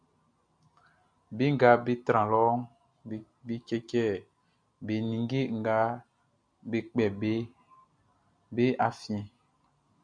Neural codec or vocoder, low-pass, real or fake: none; 9.9 kHz; real